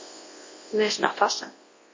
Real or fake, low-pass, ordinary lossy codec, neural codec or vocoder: fake; 7.2 kHz; MP3, 32 kbps; codec, 24 kHz, 0.9 kbps, WavTokenizer, large speech release